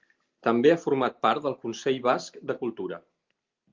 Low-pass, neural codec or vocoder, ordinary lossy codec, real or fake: 7.2 kHz; none; Opus, 32 kbps; real